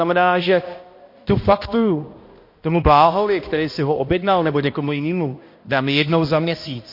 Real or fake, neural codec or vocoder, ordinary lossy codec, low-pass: fake; codec, 16 kHz, 1 kbps, X-Codec, HuBERT features, trained on balanced general audio; MP3, 32 kbps; 5.4 kHz